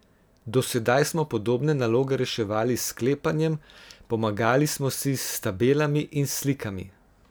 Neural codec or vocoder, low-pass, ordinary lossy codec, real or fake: none; none; none; real